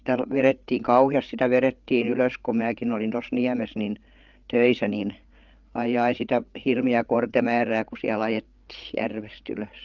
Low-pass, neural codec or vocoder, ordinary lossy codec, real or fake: 7.2 kHz; codec, 16 kHz, 8 kbps, FreqCodec, larger model; Opus, 24 kbps; fake